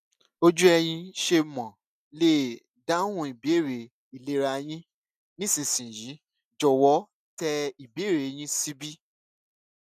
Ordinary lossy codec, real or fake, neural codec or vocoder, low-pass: none; real; none; 14.4 kHz